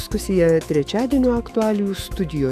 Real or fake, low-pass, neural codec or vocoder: fake; 14.4 kHz; autoencoder, 48 kHz, 128 numbers a frame, DAC-VAE, trained on Japanese speech